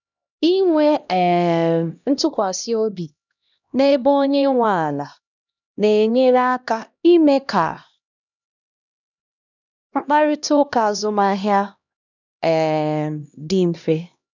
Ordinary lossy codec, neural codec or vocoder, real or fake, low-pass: none; codec, 16 kHz, 1 kbps, X-Codec, HuBERT features, trained on LibriSpeech; fake; 7.2 kHz